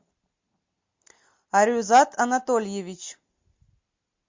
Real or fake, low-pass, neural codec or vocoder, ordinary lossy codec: real; 7.2 kHz; none; MP3, 48 kbps